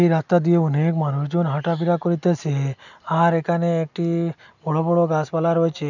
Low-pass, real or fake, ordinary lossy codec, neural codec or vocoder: 7.2 kHz; real; none; none